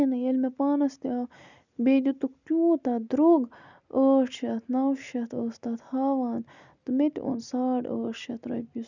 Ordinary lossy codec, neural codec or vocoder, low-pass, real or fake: none; none; 7.2 kHz; real